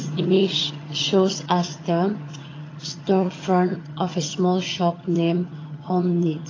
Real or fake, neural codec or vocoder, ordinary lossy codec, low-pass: fake; vocoder, 22.05 kHz, 80 mel bands, HiFi-GAN; AAC, 32 kbps; 7.2 kHz